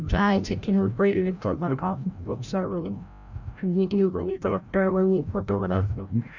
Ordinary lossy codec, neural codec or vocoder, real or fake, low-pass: none; codec, 16 kHz, 0.5 kbps, FreqCodec, larger model; fake; 7.2 kHz